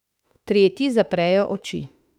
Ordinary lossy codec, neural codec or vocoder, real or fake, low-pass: none; autoencoder, 48 kHz, 32 numbers a frame, DAC-VAE, trained on Japanese speech; fake; 19.8 kHz